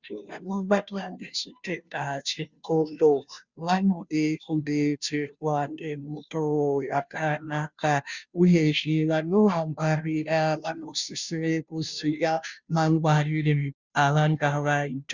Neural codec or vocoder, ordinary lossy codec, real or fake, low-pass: codec, 16 kHz, 0.5 kbps, FunCodec, trained on Chinese and English, 25 frames a second; Opus, 64 kbps; fake; 7.2 kHz